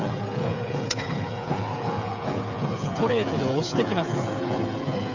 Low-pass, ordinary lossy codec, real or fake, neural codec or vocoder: 7.2 kHz; none; fake; codec, 16 kHz, 8 kbps, FreqCodec, smaller model